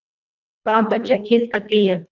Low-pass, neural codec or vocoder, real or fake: 7.2 kHz; codec, 24 kHz, 1.5 kbps, HILCodec; fake